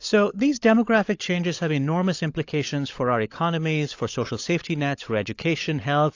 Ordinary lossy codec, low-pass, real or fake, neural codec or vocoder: AAC, 48 kbps; 7.2 kHz; fake; codec, 16 kHz, 16 kbps, FunCodec, trained on LibriTTS, 50 frames a second